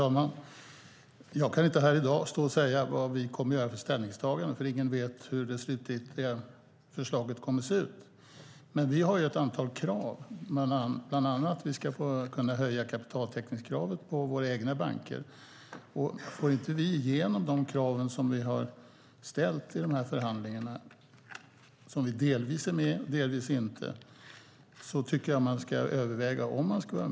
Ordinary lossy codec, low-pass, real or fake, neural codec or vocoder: none; none; real; none